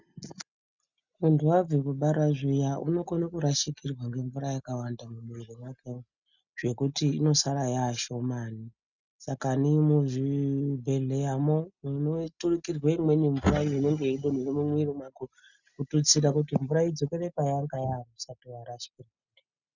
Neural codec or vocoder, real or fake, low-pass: none; real; 7.2 kHz